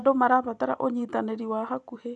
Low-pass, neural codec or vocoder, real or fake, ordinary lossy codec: 10.8 kHz; none; real; none